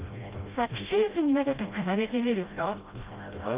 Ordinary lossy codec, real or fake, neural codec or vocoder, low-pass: Opus, 24 kbps; fake; codec, 16 kHz, 0.5 kbps, FreqCodec, smaller model; 3.6 kHz